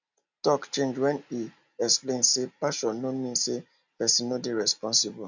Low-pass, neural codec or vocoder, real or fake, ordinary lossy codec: 7.2 kHz; none; real; none